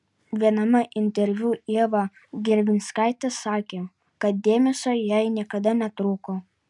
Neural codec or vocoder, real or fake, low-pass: none; real; 10.8 kHz